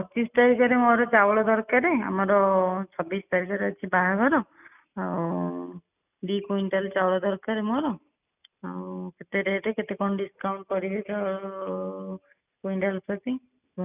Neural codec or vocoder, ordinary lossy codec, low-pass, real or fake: none; none; 3.6 kHz; real